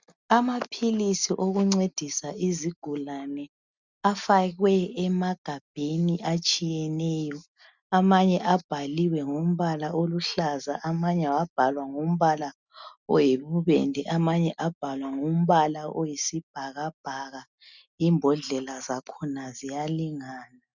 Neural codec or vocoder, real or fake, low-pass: none; real; 7.2 kHz